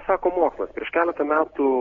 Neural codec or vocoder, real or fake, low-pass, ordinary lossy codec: none; real; 7.2 kHz; AAC, 32 kbps